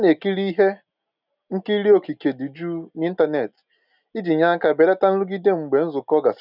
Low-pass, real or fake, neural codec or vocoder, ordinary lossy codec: 5.4 kHz; real; none; none